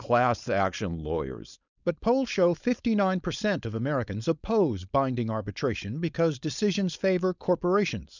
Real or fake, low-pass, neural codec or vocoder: fake; 7.2 kHz; codec, 16 kHz, 4.8 kbps, FACodec